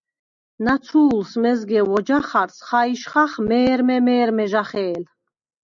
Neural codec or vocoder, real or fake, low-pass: none; real; 7.2 kHz